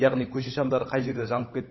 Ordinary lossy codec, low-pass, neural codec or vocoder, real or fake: MP3, 24 kbps; 7.2 kHz; codec, 16 kHz, 16 kbps, FunCodec, trained on LibriTTS, 50 frames a second; fake